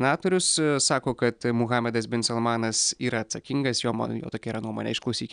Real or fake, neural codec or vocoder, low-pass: real; none; 9.9 kHz